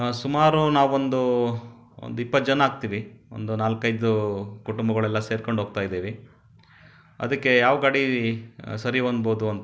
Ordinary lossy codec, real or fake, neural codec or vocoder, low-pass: none; real; none; none